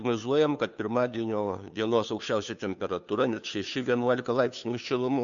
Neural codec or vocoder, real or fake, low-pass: codec, 16 kHz, 2 kbps, FunCodec, trained on Chinese and English, 25 frames a second; fake; 7.2 kHz